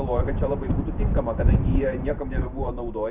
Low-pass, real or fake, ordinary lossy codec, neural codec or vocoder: 3.6 kHz; fake; Opus, 64 kbps; vocoder, 44.1 kHz, 128 mel bands every 512 samples, BigVGAN v2